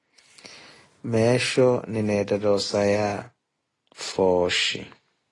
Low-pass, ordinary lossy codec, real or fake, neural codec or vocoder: 10.8 kHz; AAC, 32 kbps; real; none